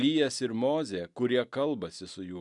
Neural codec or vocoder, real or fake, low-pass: none; real; 10.8 kHz